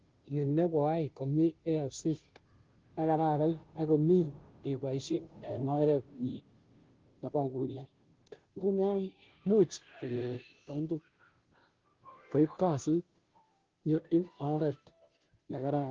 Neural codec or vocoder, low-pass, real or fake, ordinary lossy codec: codec, 16 kHz, 0.5 kbps, FunCodec, trained on Chinese and English, 25 frames a second; 7.2 kHz; fake; Opus, 16 kbps